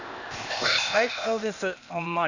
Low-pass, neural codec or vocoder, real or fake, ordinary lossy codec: 7.2 kHz; codec, 16 kHz, 0.8 kbps, ZipCodec; fake; none